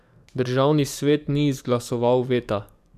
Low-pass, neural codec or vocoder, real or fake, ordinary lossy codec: 14.4 kHz; codec, 44.1 kHz, 7.8 kbps, DAC; fake; none